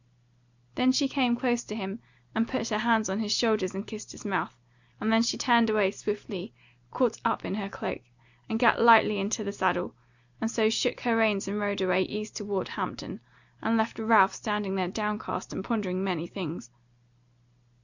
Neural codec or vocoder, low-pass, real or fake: none; 7.2 kHz; real